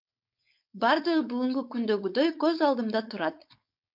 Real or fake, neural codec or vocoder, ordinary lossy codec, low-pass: fake; codec, 16 kHz, 4.8 kbps, FACodec; MP3, 48 kbps; 5.4 kHz